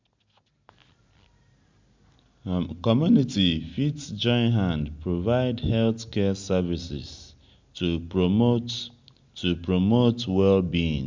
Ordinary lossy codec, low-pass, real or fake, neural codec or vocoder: MP3, 64 kbps; 7.2 kHz; real; none